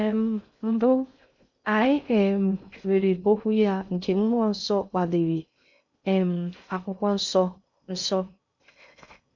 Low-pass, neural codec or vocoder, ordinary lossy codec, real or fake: 7.2 kHz; codec, 16 kHz in and 24 kHz out, 0.6 kbps, FocalCodec, streaming, 2048 codes; none; fake